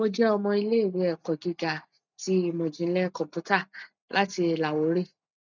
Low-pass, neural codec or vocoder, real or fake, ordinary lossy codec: 7.2 kHz; none; real; none